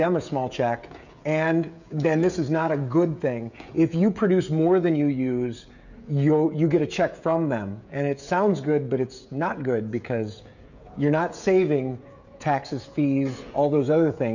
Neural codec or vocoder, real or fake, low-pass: codec, 16 kHz, 16 kbps, FreqCodec, smaller model; fake; 7.2 kHz